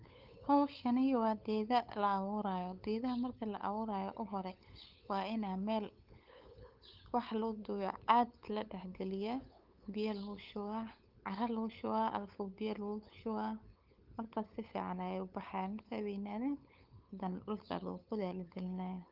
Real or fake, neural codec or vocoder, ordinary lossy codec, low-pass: fake; codec, 16 kHz, 8 kbps, FunCodec, trained on LibriTTS, 25 frames a second; Opus, 24 kbps; 5.4 kHz